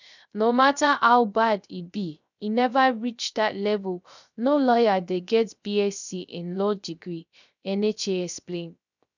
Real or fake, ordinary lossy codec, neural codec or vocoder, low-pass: fake; none; codec, 16 kHz, 0.3 kbps, FocalCodec; 7.2 kHz